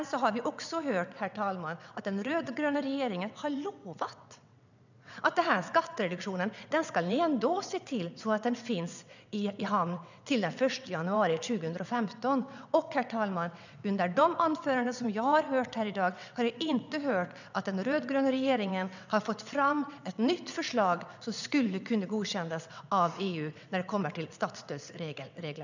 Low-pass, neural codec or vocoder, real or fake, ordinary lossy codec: 7.2 kHz; vocoder, 22.05 kHz, 80 mel bands, WaveNeXt; fake; none